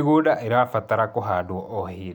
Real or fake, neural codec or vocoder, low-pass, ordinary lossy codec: real; none; 19.8 kHz; none